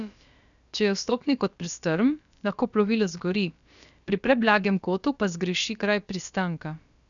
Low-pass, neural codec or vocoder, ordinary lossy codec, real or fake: 7.2 kHz; codec, 16 kHz, about 1 kbps, DyCAST, with the encoder's durations; none; fake